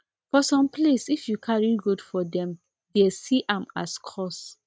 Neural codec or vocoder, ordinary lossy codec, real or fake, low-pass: none; none; real; none